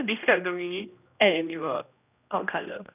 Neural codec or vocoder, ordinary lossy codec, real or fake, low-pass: codec, 16 kHz, 1 kbps, X-Codec, HuBERT features, trained on general audio; none; fake; 3.6 kHz